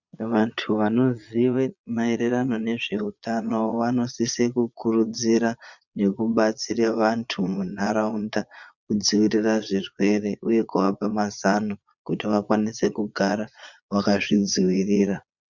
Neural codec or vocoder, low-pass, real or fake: vocoder, 22.05 kHz, 80 mel bands, Vocos; 7.2 kHz; fake